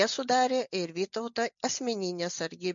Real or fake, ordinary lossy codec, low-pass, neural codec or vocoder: real; MP3, 64 kbps; 7.2 kHz; none